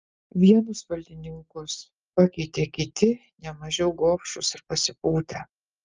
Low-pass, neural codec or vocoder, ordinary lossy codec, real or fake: 7.2 kHz; none; Opus, 24 kbps; real